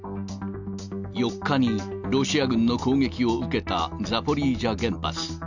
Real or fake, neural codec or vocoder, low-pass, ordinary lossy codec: real; none; 7.2 kHz; none